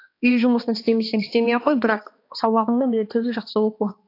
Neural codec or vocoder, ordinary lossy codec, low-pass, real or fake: codec, 16 kHz, 2 kbps, X-Codec, HuBERT features, trained on balanced general audio; AAC, 32 kbps; 5.4 kHz; fake